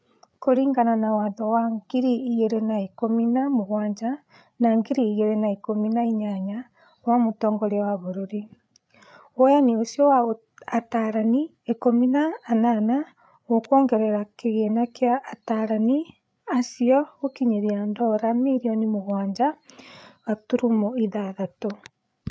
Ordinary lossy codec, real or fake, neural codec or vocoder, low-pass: none; fake; codec, 16 kHz, 8 kbps, FreqCodec, larger model; none